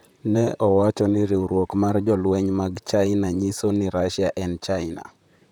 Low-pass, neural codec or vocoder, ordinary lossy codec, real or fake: 19.8 kHz; vocoder, 44.1 kHz, 128 mel bands, Pupu-Vocoder; none; fake